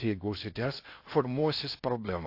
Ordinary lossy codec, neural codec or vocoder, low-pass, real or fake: AAC, 32 kbps; codec, 16 kHz in and 24 kHz out, 0.6 kbps, FocalCodec, streaming, 2048 codes; 5.4 kHz; fake